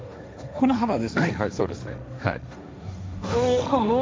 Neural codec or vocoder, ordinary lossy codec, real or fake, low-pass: codec, 16 kHz, 1.1 kbps, Voila-Tokenizer; none; fake; none